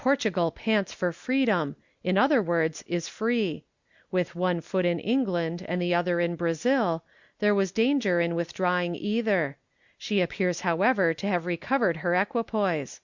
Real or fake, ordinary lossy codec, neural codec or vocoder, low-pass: real; Opus, 64 kbps; none; 7.2 kHz